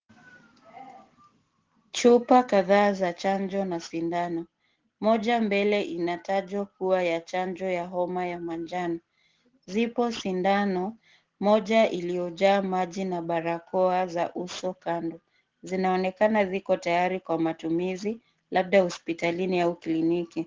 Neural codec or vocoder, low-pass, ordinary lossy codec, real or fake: none; 7.2 kHz; Opus, 16 kbps; real